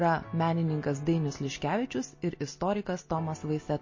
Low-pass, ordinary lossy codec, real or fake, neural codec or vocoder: 7.2 kHz; MP3, 32 kbps; real; none